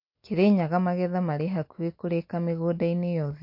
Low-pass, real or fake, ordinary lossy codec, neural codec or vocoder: 5.4 kHz; real; MP3, 32 kbps; none